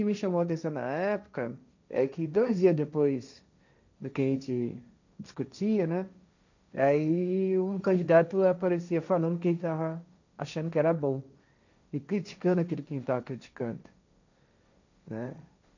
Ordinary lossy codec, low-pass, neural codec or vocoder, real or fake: none; 7.2 kHz; codec, 16 kHz, 1.1 kbps, Voila-Tokenizer; fake